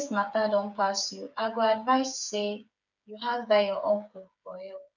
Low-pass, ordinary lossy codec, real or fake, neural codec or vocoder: 7.2 kHz; none; fake; codec, 16 kHz, 8 kbps, FreqCodec, smaller model